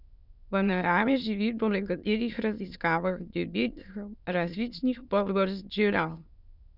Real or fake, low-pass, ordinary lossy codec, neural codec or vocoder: fake; 5.4 kHz; none; autoencoder, 22.05 kHz, a latent of 192 numbers a frame, VITS, trained on many speakers